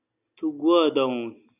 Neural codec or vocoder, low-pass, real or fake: none; 3.6 kHz; real